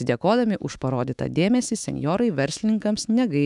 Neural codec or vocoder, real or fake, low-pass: autoencoder, 48 kHz, 128 numbers a frame, DAC-VAE, trained on Japanese speech; fake; 10.8 kHz